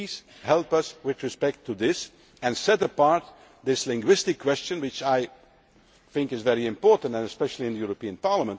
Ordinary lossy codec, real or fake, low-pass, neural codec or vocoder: none; real; none; none